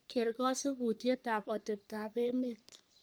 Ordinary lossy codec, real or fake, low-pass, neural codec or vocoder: none; fake; none; codec, 44.1 kHz, 3.4 kbps, Pupu-Codec